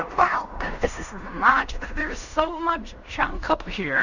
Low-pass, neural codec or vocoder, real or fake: 7.2 kHz; codec, 16 kHz in and 24 kHz out, 0.4 kbps, LongCat-Audio-Codec, fine tuned four codebook decoder; fake